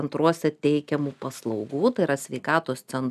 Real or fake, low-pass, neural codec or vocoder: real; 14.4 kHz; none